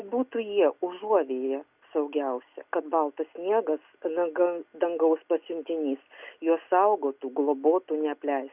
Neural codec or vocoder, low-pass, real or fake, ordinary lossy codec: none; 3.6 kHz; real; Opus, 64 kbps